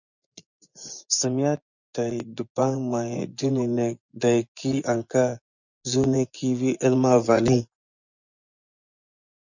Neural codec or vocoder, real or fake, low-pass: vocoder, 24 kHz, 100 mel bands, Vocos; fake; 7.2 kHz